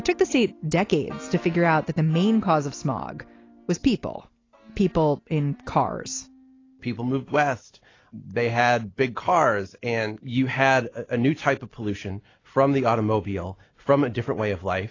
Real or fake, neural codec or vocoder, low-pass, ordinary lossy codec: real; none; 7.2 kHz; AAC, 32 kbps